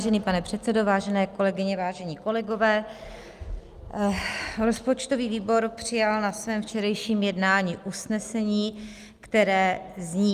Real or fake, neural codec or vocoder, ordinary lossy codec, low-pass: real; none; Opus, 32 kbps; 14.4 kHz